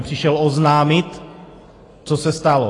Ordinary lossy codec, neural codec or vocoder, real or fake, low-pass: AAC, 32 kbps; none; real; 10.8 kHz